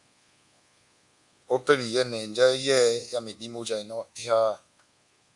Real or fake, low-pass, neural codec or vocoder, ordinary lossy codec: fake; 10.8 kHz; codec, 24 kHz, 1.2 kbps, DualCodec; Opus, 64 kbps